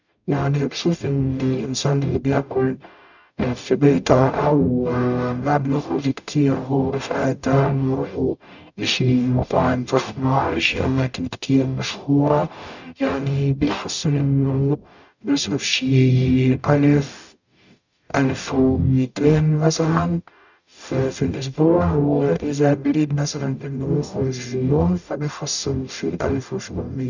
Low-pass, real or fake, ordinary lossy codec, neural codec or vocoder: 7.2 kHz; fake; none; codec, 44.1 kHz, 0.9 kbps, DAC